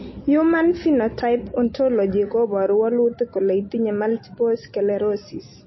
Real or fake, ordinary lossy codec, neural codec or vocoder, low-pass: real; MP3, 24 kbps; none; 7.2 kHz